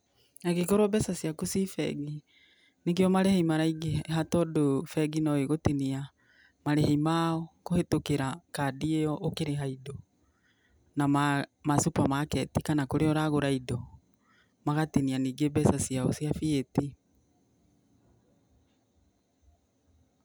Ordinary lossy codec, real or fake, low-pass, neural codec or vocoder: none; real; none; none